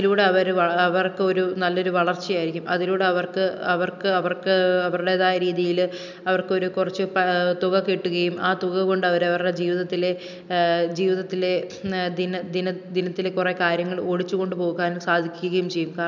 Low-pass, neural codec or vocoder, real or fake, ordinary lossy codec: 7.2 kHz; none; real; none